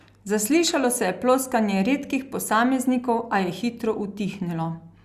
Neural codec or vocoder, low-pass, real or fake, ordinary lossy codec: none; 14.4 kHz; real; Opus, 64 kbps